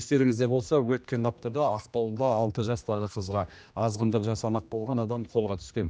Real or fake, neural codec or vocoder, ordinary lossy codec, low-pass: fake; codec, 16 kHz, 1 kbps, X-Codec, HuBERT features, trained on general audio; none; none